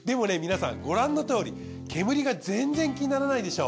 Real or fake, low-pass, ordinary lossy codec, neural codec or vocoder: real; none; none; none